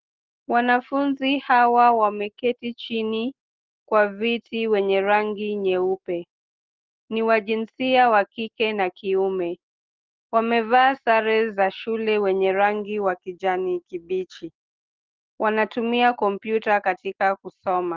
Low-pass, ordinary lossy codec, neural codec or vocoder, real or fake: 7.2 kHz; Opus, 16 kbps; none; real